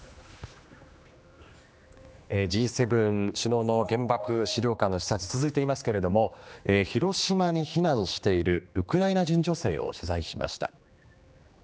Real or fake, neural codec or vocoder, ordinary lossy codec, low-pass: fake; codec, 16 kHz, 2 kbps, X-Codec, HuBERT features, trained on general audio; none; none